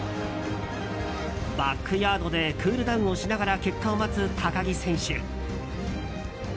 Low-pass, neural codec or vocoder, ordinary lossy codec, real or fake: none; none; none; real